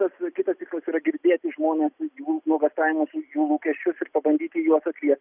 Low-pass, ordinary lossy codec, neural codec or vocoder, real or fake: 3.6 kHz; Opus, 64 kbps; none; real